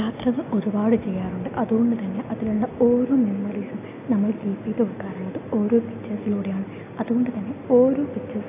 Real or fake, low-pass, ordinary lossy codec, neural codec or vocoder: real; 3.6 kHz; AAC, 24 kbps; none